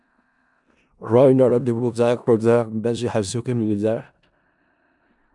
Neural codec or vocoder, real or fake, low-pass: codec, 16 kHz in and 24 kHz out, 0.4 kbps, LongCat-Audio-Codec, four codebook decoder; fake; 10.8 kHz